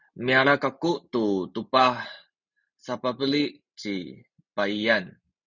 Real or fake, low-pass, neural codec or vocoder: real; 7.2 kHz; none